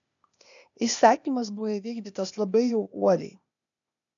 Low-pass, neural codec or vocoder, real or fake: 7.2 kHz; codec, 16 kHz, 0.8 kbps, ZipCodec; fake